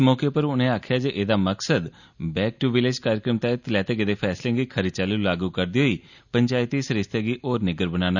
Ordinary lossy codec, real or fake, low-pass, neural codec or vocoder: none; real; 7.2 kHz; none